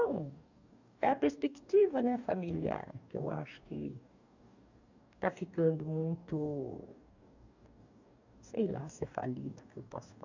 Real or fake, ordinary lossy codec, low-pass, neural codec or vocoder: fake; none; 7.2 kHz; codec, 44.1 kHz, 2.6 kbps, DAC